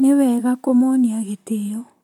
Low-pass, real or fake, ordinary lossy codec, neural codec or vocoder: 19.8 kHz; fake; none; vocoder, 44.1 kHz, 128 mel bands, Pupu-Vocoder